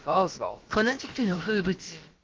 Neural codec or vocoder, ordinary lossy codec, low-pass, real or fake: codec, 16 kHz, about 1 kbps, DyCAST, with the encoder's durations; Opus, 16 kbps; 7.2 kHz; fake